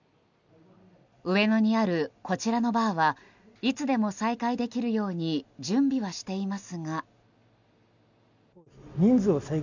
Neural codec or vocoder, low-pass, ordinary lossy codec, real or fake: none; 7.2 kHz; none; real